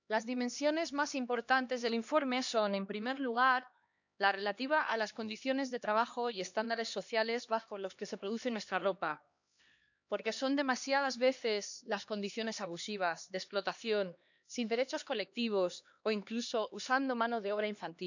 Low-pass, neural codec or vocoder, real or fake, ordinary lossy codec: 7.2 kHz; codec, 16 kHz, 2 kbps, X-Codec, HuBERT features, trained on LibriSpeech; fake; none